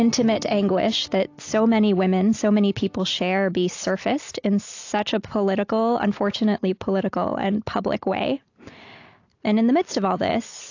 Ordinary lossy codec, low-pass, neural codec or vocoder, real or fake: AAC, 48 kbps; 7.2 kHz; none; real